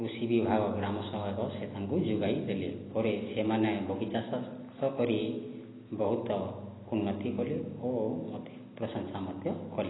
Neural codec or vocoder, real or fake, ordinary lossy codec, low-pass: none; real; AAC, 16 kbps; 7.2 kHz